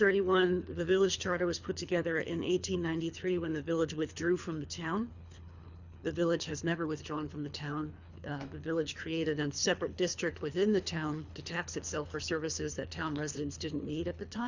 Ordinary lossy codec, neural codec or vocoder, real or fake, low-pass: Opus, 64 kbps; codec, 24 kHz, 3 kbps, HILCodec; fake; 7.2 kHz